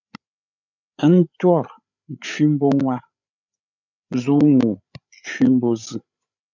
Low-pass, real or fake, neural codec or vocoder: 7.2 kHz; fake; codec, 16 kHz, 8 kbps, FreqCodec, larger model